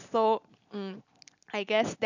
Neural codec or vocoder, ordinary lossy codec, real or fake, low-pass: none; none; real; 7.2 kHz